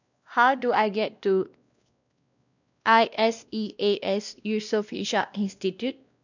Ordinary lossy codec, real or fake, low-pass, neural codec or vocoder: none; fake; 7.2 kHz; codec, 16 kHz, 1 kbps, X-Codec, WavLM features, trained on Multilingual LibriSpeech